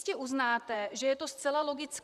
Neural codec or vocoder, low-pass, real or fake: vocoder, 44.1 kHz, 128 mel bands, Pupu-Vocoder; 14.4 kHz; fake